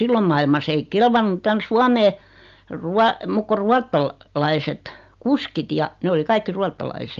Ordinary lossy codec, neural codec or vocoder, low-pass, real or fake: Opus, 32 kbps; none; 7.2 kHz; real